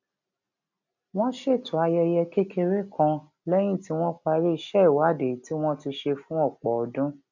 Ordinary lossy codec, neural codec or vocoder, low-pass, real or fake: none; none; 7.2 kHz; real